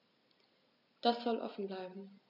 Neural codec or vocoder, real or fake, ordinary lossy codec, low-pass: none; real; none; 5.4 kHz